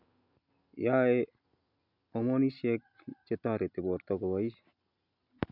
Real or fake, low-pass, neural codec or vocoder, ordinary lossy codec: real; 5.4 kHz; none; none